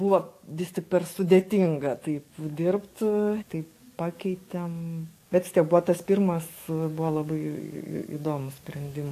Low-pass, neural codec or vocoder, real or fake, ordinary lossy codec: 14.4 kHz; codec, 44.1 kHz, 7.8 kbps, DAC; fake; AAC, 64 kbps